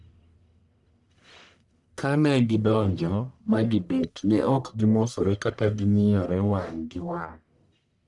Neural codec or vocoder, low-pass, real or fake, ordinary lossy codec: codec, 44.1 kHz, 1.7 kbps, Pupu-Codec; 10.8 kHz; fake; none